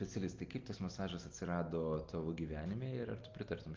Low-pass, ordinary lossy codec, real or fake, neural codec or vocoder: 7.2 kHz; Opus, 32 kbps; real; none